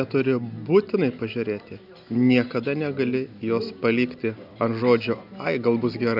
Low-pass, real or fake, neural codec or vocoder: 5.4 kHz; real; none